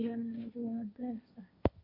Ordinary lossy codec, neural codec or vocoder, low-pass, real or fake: MP3, 32 kbps; codec, 16 kHz, 1.1 kbps, Voila-Tokenizer; 5.4 kHz; fake